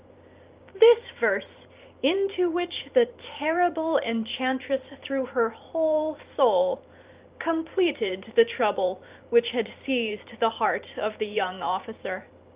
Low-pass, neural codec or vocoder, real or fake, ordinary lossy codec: 3.6 kHz; vocoder, 44.1 kHz, 128 mel bands every 512 samples, BigVGAN v2; fake; Opus, 24 kbps